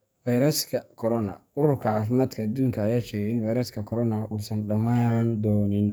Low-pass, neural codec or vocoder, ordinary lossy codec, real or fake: none; codec, 44.1 kHz, 2.6 kbps, SNAC; none; fake